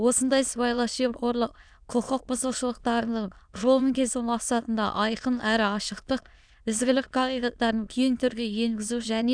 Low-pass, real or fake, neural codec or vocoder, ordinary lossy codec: 9.9 kHz; fake; autoencoder, 22.05 kHz, a latent of 192 numbers a frame, VITS, trained on many speakers; none